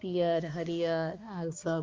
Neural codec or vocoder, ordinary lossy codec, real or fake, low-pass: codec, 16 kHz, 2 kbps, X-Codec, HuBERT features, trained on balanced general audio; Opus, 32 kbps; fake; 7.2 kHz